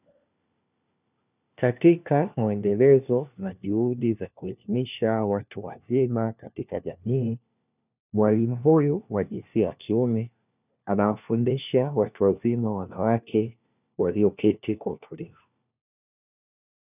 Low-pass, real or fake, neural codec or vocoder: 3.6 kHz; fake; codec, 16 kHz, 1 kbps, FunCodec, trained on LibriTTS, 50 frames a second